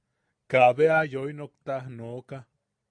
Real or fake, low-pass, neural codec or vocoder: real; 9.9 kHz; none